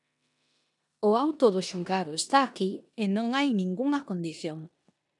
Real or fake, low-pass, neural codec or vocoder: fake; 10.8 kHz; codec, 16 kHz in and 24 kHz out, 0.9 kbps, LongCat-Audio-Codec, four codebook decoder